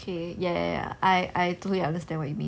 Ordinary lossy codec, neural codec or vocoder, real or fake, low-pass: none; none; real; none